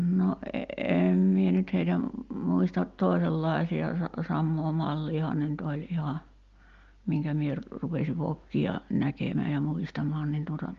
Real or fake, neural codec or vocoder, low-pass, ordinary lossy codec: real; none; 7.2 kHz; Opus, 32 kbps